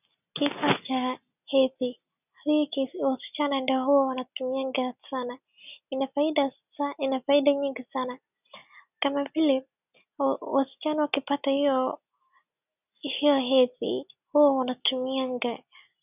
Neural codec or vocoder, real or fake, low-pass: none; real; 3.6 kHz